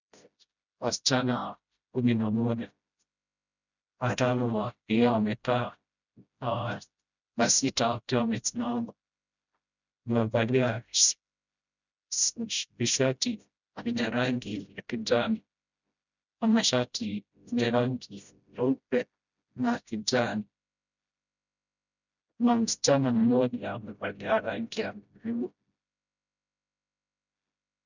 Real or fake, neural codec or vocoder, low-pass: fake; codec, 16 kHz, 0.5 kbps, FreqCodec, smaller model; 7.2 kHz